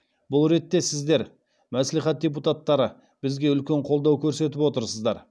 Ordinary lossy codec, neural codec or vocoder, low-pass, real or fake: none; none; none; real